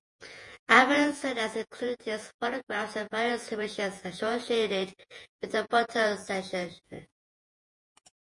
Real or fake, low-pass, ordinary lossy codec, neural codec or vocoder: fake; 10.8 kHz; MP3, 48 kbps; vocoder, 48 kHz, 128 mel bands, Vocos